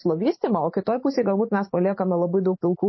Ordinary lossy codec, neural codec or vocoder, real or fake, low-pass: MP3, 24 kbps; vocoder, 44.1 kHz, 128 mel bands every 512 samples, BigVGAN v2; fake; 7.2 kHz